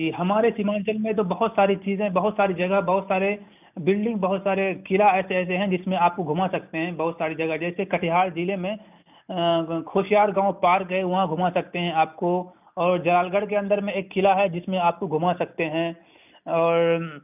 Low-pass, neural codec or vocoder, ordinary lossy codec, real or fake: 3.6 kHz; none; none; real